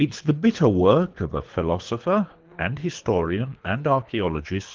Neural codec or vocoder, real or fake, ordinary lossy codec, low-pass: codec, 24 kHz, 3 kbps, HILCodec; fake; Opus, 32 kbps; 7.2 kHz